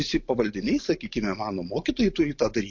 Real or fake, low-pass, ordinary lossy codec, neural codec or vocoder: real; 7.2 kHz; MP3, 48 kbps; none